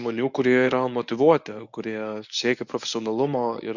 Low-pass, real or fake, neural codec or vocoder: 7.2 kHz; fake; codec, 24 kHz, 0.9 kbps, WavTokenizer, medium speech release version 1